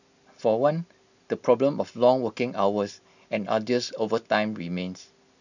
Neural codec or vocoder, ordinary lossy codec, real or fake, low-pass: none; none; real; 7.2 kHz